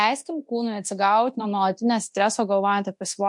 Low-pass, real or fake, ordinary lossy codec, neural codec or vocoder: 10.8 kHz; fake; MP3, 64 kbps; codec, 24 kHz, 0.9 kbps, DualCodec